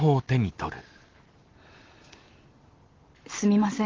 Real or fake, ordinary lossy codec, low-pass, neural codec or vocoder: real; Opus, 16 kbps; 7.2 kHz; none